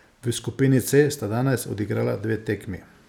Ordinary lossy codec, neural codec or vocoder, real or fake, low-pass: none; none; real; 19.8 kHz